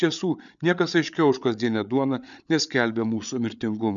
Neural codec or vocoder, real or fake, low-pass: codec, 16 kHz, 8 kbps, FreqCodec, larger model; fake; 7.2 kHz